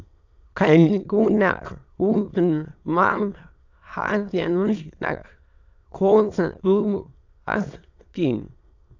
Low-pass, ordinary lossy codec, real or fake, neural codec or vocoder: 7.2 kHz; AAC, 48 kbps; fake; autoencoder, 22.05 kHz, a latent of 192 numbers a frame, VITS, trained on many speakers